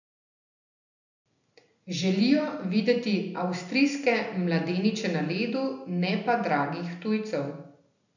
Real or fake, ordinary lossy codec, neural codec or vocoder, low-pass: real; none; none; 7.2 kHz